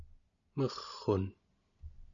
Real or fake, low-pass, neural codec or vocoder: real; 7.2 kHz; none